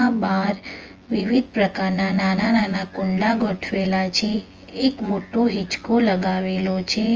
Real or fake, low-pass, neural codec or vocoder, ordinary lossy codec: fake; 7.2 kHz; vocoder, 24 kHz, 100 mel bands, Vocos; Opus, 24 kbps